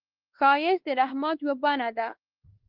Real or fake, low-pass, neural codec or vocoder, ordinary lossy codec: fake; 5.4 kHz; codec, 16 kHz, 2 kbps, X-Codec, WavLM features, trained on Multilingual LibriSpeech; Opus, 16 kbps